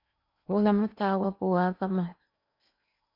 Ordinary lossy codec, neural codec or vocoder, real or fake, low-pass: MP3, 48 kbps; codec, 16 kHz in and 24 kHz out, 0.8 kbps, FocalCodec, streaming, 65536 codes; fake; 5.4 kHz